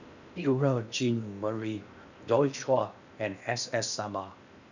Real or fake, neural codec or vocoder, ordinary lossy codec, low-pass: fake; codec, 16 kHz in and 24 kHz out, 0.8 kbps, FocalCodec, streaming, 65536 codes; none; 7.2 kHz